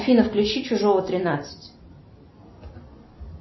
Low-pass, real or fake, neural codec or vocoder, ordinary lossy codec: 7.2 kHz; real; none; MP3, 24 kbps